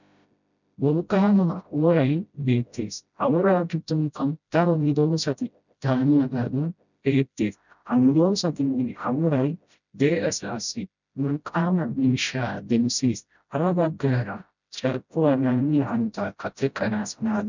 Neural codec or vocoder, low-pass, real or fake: codec, 16 kHz, 0.5 kbps, FreqCodec, smaller model; 7.2 kHz; fake